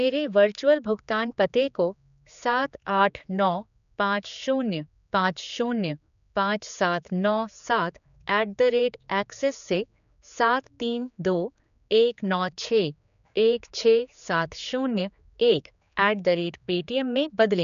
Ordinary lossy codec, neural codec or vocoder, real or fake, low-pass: none; codec, 16 kHz, 4 kbps, X-Codec, HuBERT features, trained on general audio; fake; 7.2 kHz